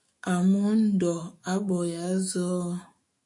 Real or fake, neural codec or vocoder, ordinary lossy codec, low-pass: fake; autoencoder, 48 kHz, 128 numbers a frame, DAC-VAE, trained on Japanese speech; MP3, 48 kbps; 10.8 kHz